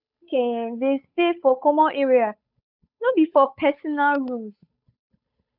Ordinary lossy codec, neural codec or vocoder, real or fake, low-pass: none; codec, 16 kHz, 8 kbps, FunCodec, trained on Chinese and English, 25 frames a second; fake; 5.4 kHz